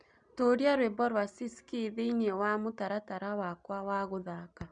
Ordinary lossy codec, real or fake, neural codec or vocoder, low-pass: none; real; none; 9.9 kHz